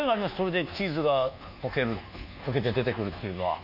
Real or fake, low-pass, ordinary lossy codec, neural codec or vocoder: fake; 5.4 kHz; none; codec, 24 kHz, 1.2 kbps, DualCodec